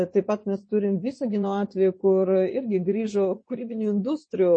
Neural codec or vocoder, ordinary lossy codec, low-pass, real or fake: vocoder, 24 kHz, 100 mel bands, Vocos; MP3, 32 kbps; 9.9 kHz; fake